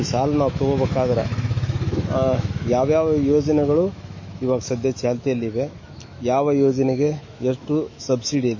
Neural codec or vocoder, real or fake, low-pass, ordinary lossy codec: autoencoder, 48 kHz, 128 numbers a frame, DAC-VAE, trained on Japanese speech; fake; 7.2 kHz; MP3, 32 kbps